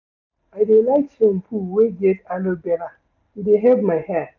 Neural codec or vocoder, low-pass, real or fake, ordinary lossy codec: none; 7.2 kHz; real; none